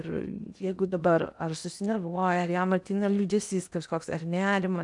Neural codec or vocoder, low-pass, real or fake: codec, 16 kHz in and 24 kHz out, 0.8 kbps, FocalCodec, streaming, 65536 codes; 10.8 kHz; fake